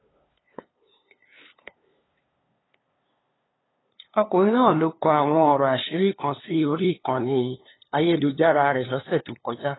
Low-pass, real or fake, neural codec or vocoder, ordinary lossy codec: 7.2 kHz; fake; codec, 16 kHz, 2 kbps, FunCodec, trained on LibriTTS, 25 frames a second; AAC, 16 kbps